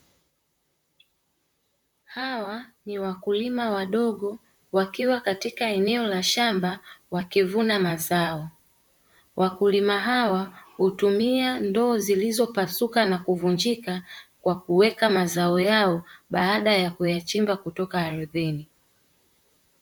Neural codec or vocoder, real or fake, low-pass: vocoder, 44.1 kHz, 128 mel bands, Pupu-Vocoder; fake; 19.8 kHz